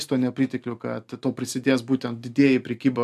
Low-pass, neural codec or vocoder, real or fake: 14.4 kHz; none; real